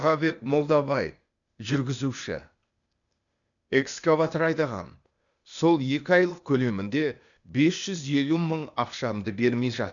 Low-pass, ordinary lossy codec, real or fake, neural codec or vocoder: 7.2 kHz; none; fake; codec, 16 kHz, 0.8 kbps, ZipCodec